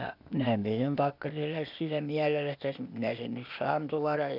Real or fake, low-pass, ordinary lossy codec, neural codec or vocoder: fake; 5.4 kHz; none; codec, 16 kHz, 0.8 kbps, ZipCodec